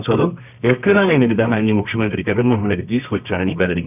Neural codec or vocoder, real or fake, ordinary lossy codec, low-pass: codec, 24 kHz, 0.9 kbps, WavTokenizer, medium music audio release; fake; none; 3.6 kHz